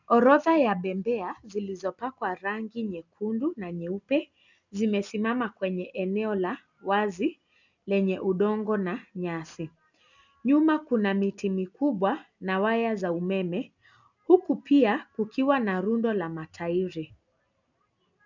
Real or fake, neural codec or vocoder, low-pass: real; none; 7.2 kHz